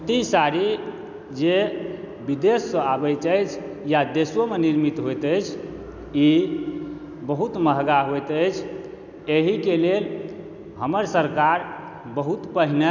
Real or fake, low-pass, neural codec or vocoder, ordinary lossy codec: real; 7.2 kHz; none; none